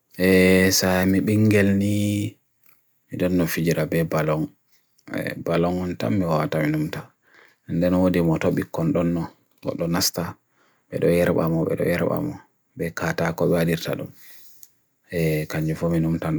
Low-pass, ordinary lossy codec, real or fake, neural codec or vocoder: none; none; real; none